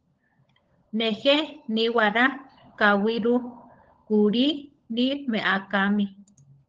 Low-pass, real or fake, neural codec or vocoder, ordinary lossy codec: 7.2 kHz; fake; codec, 16 kHz, 16 kbps, FunCodec, trained on LibriTTS, 50 frames a second; Opus, 16 kbps